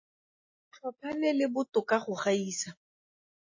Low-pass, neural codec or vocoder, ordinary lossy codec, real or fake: 7.2 kHz; none; MP3, 32 kbps; real